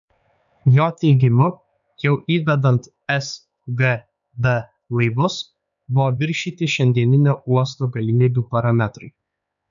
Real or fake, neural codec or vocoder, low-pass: fake; codec, 16 kHz, 4 kbps, X-Codec, HuBERT features, trained on LibriSpeech; 7.2 kHz